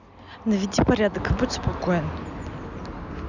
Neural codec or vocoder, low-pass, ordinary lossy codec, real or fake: none; 7.2 kHz; none; real